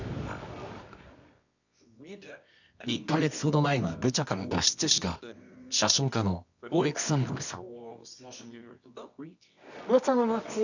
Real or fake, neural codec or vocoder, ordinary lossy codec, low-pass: fake; codec, 24 kHz, 0.9 kbps, WavTokenizer, medium music audio release; none; 7.2 kHz